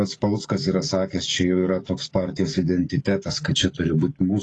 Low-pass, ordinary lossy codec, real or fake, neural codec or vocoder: 10.8 kHz; AAC, 32 kbps; fake; codec, 44.1 kHz, 7.8 kbps, DAC